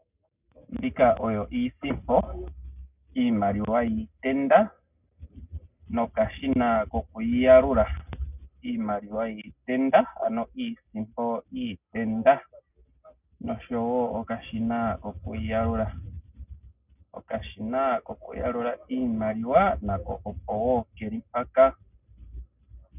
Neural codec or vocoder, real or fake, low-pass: none; real; 3.6 kHz